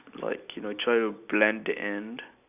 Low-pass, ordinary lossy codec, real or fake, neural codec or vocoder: 3.6 kHz; none; real; none